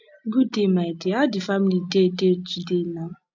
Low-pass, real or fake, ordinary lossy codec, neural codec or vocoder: 7.2 kHz; real; MP3, 64 kbps; none